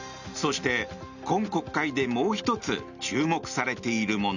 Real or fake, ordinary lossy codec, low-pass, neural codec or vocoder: real; none; 7.2 kHz; none